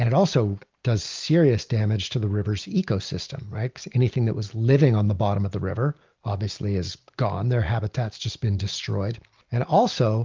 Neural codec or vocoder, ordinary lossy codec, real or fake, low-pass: none; Opus, 24 kbps; real; 7.2 kHz